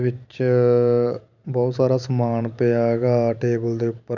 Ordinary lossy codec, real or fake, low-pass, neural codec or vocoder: none; fake; 7.2 kHz; vocoder, 44.1 kHz, 128 mel bands every 512 samples, BigVGAN v2